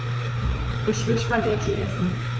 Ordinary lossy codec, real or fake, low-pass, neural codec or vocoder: none; fake; none; codec, 16 kHz, 4 kbps, FreqCodec, larger model